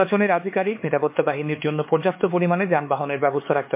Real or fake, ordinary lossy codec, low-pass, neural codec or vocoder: fake; MP3, 24 kbps; 3.6 kHz; codec, 16 kHz, 4 kbps, X-Codec, HuBERT features, trained on LibriSpeech